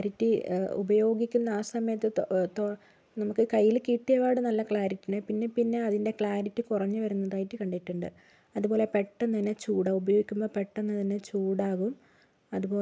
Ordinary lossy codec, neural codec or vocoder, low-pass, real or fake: none; none; none; real